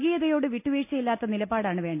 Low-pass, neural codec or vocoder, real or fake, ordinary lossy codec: 3.6 kHz; none; real; none